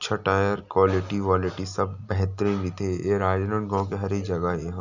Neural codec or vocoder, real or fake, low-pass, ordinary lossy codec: none; real; 7.2 kHz; none